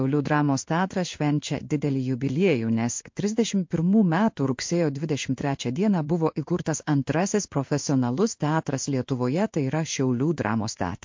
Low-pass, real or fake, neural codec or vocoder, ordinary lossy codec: 7.2 kHz; fake; codec, 16 kHz in and 24 kHz out, 1 kbps, XY-Tokenizer; MP3, 48 kbps